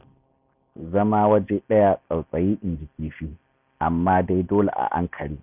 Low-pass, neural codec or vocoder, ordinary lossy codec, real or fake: 3.6 kHz; none; none; real